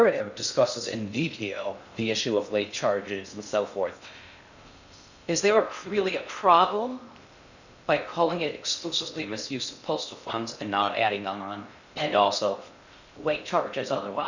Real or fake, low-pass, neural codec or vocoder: fake; 7.2 kHz; codec, 16 kHz in and 24 kHz out, 0.6 kbps, FocalCodec, streaming, 2048 codes